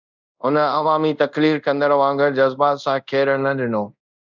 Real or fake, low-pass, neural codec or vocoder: fake; 7.2 kHz; codec, 24 kHz, 0.5 kbps, DualCodec